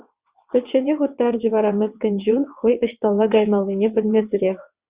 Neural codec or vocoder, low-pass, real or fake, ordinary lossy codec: vocoder, 22.05 kHz, 80 mel bands, WaveNeXt; 3.6 kHz; fake; Opus, 64 kbps